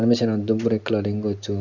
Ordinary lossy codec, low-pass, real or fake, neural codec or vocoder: none; 7.2 kHz; real; none